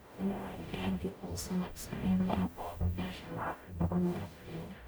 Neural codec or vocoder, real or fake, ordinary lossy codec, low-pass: codec, 44.1 kHz, 0.9 kbps, DAC; fake; none; none